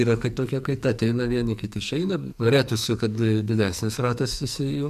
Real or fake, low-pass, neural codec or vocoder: fake; 14.4 kHz; codec, 44.1 kHz, 2.6 kbps, SNAC